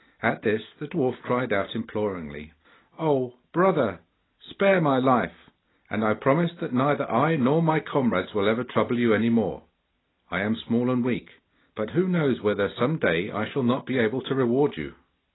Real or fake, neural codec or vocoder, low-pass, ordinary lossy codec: real; none; 7.2 kHz; AAC, 16 kbps